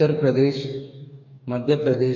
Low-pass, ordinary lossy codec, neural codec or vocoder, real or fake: 7.2 kHz; MP3, 64 kbps; codec, 44.1 kHz, 2.6 kbps, DAC; fake